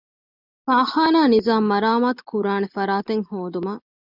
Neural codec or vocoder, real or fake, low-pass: none; real; 5.4 kHz